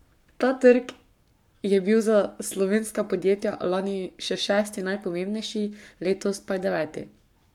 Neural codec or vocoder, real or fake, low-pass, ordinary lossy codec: codec, 44.1 kHz, 7.8 kbps, Pupu-Codec; fake; 19.8 kHz; none